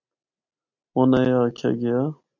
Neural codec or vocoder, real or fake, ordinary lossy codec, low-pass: none; real; MP3, 64 kbps; 7.2 kHz